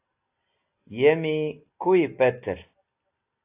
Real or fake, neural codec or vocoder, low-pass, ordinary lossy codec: real; none; 3.6 kHz; AAC, 24 kbps